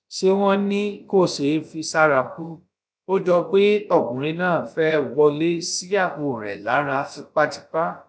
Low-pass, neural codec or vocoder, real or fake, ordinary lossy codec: none; codec, 16 kHz, about 1 kbps, DyCAST, with the encoder's durations; fake; none